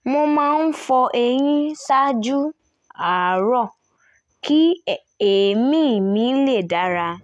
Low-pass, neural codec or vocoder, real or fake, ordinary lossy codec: none; none; real; none